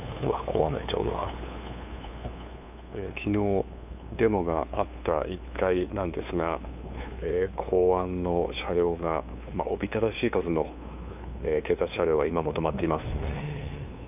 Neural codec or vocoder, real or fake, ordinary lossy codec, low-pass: codec, 16 kHz, 2 kbps, FunCodec, trained on LibriTTS, 25 frames a second; fake; none; 3.6 kHz